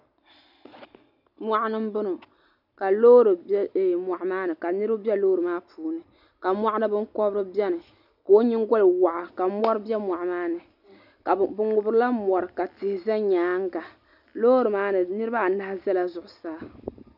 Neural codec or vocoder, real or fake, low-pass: none; real; 5.4 kHz